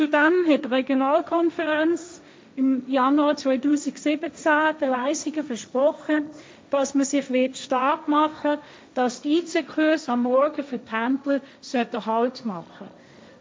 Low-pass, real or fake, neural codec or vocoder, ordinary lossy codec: none; fake; codec, 16 kHz, 1.1 kbps, Voila-Tokenizer; none